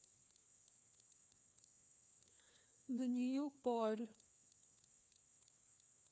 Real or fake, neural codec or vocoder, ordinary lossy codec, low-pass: fake; codec, 16 kHz, 2 kbps, FreqCodec, larger model; none; none